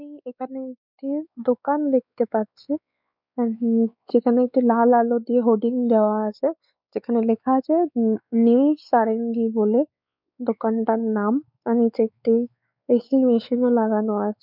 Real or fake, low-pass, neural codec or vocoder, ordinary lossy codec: fake; 5.4 kHz; codec, 16 kHz, 4 kbps, X-Codec, WavLM features, trained on Multilingual LibriSpeech; none